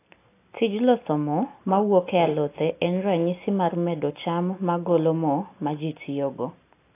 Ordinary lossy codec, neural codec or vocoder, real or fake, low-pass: AAC, 24 kbps; none; real; 3.6 kHz